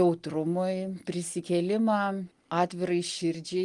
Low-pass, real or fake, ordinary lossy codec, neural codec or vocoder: 10.8 kHz; real; Opus, 24 kbps; none